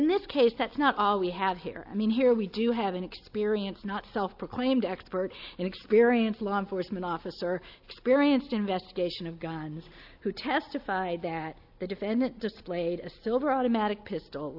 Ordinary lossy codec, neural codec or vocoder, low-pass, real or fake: AAC, 48 kbps; none; 5.4 kHz; real